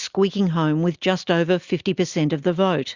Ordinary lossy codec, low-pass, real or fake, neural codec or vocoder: Opus, 64 kbps; 7.2 kHz; real; none